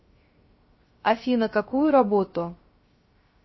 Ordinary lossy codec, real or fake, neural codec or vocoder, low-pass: MP3, 24 kbps; fake; codec, 16 kHz, 0.3 kbps, FocalCodec; 7.2 kHz